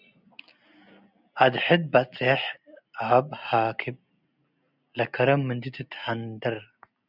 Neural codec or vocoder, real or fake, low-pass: none; real; 5.4 kHz